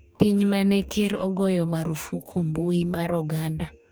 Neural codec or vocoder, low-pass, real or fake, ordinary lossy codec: codec, 44.1 kHz, 2.6 kbps, DAC; none; fake; none